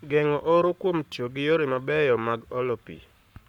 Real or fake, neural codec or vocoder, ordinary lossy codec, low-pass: fake; codec, 44.1 kHz, 7.8 kbps, Pupu-Codec; none; 19.8 kHz